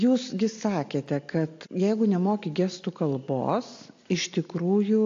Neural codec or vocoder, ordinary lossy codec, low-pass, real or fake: none; AAC, 48 kbps; 7.2 kHz; real